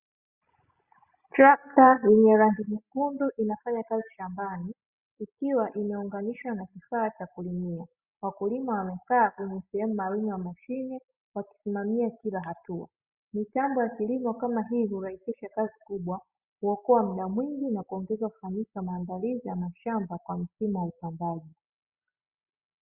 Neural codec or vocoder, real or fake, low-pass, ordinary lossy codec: none; real; 3.6 kHz; Opus, 64 kbps